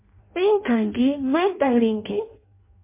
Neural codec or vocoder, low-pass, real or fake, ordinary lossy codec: codec, 16 kHz in and 24 kHz out, 0.6 kbps, FireRedTTS-2 codec; 3.6 kHz; fake; MP3, 24 kbps